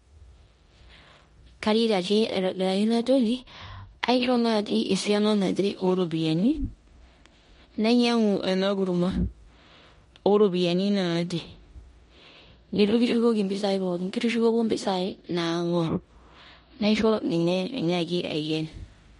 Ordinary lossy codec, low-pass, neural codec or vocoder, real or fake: MP3, 48 kbps; 10.8 kHz; codec, 16 kHz in and 24 kHz out, 0.9 kbps, LongCat-Audio-Codec, four codebook decoder; fake